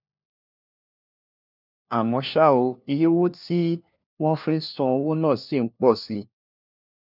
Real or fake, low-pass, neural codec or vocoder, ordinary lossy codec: fake; 5.4 kHz; codec, 16 kHz, 1 kbps, FunCodec, trained on LibriTTS, 50 frames a second; none